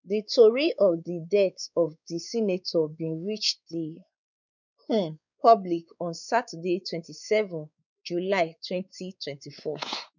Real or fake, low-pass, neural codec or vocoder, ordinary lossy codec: fake; 7.2 kHz; codec, 16 kHz, 4 kbps, X-Codec, WavLM features, trained on Multilingual LibriSpeech; none